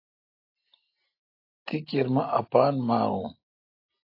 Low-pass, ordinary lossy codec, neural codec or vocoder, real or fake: 5.4 kHz; AAC, 32 kbps; none; real